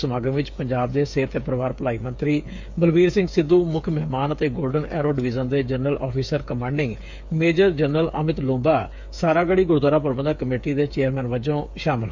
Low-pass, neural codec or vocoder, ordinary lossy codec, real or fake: 7.2 kHz; codec, 16 kHz, 8 kbps, FreqCodec, smaller model; MP3, 64 kbps; fake